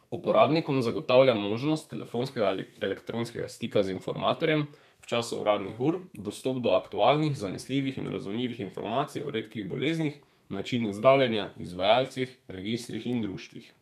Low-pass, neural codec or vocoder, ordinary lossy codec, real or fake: 14.4 kHz; codec, 32 kHz, 1.9 kbps, SNAC; none; fake